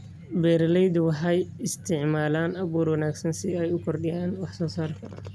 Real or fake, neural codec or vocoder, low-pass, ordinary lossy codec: real; none; none; none